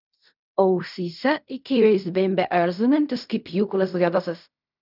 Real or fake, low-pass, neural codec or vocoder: fake; 5.4 kHz; codec, 16 kHz in and 24 kHz out, 0.4 kbps, LongCat-Audio-Codec, fine tuned four codebook decoder